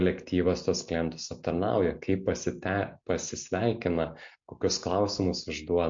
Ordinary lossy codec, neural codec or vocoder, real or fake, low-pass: MP3, 48 kbps; none; real; 7.2 kHz